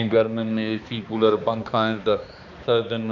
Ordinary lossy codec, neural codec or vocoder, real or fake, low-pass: none; codec, 16 kHz, 2 kbps, X-Codec, HuBERT features, trained on balanced general audio; fake; 7.2 kHz